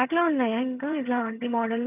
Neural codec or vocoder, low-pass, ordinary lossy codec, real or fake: vocoder, 22.05 kHz, 80 mel bands, HiFi-GAN; 3.6 kHz; none; fake